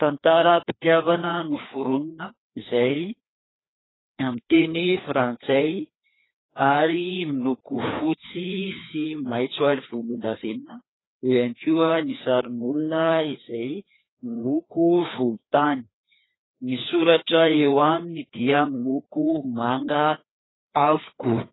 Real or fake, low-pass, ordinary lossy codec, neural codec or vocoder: fake; 7.2 kHz; AAC, 16 kbps; codec, 16 kHz, 2 kbps, FreqCodec, larger model